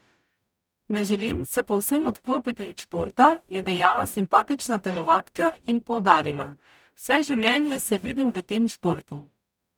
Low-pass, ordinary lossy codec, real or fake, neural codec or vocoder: none; none; fake; codec, 44.1 kHz, 0.9 kbps, DAC